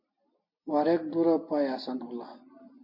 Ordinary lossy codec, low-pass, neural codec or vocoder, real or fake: MP3, 32 kbps; 5.4 kHz; none; real